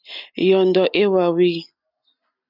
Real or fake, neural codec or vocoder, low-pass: real; none; 5.4 kHz